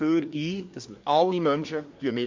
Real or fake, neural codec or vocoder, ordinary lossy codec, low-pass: fake; codec, 24 kHz, 1 kbps, SNAC; MP3, 48 kbps; 7.2 kHz